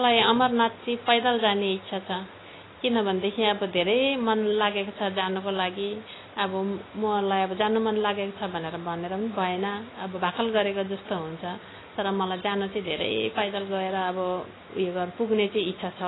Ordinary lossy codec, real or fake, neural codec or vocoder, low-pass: AAC, 16 kbps; real; none; 7.2 kHz